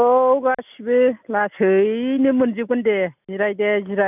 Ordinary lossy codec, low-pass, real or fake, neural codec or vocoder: none; 3.6 kHz; real; none